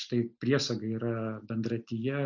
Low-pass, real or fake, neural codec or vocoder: 7.2 kHz; real; none